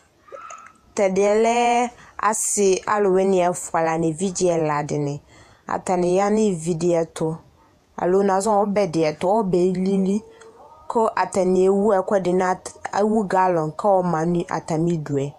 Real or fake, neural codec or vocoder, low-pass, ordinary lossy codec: fake; vocoder, 48 kHz, 128 mel bands, Vocos; 14.4 kHz; AAC, 96 kbps